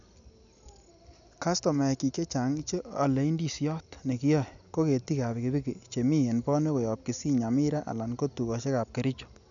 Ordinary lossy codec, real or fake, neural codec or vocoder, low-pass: none; real; none; 7.2 kHz